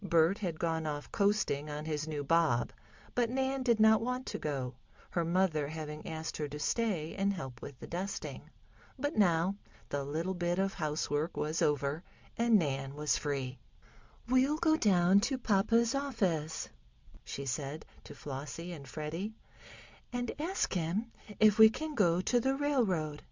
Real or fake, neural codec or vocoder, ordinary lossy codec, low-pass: real; none; MP3, 64 kbps; 7.2 kHz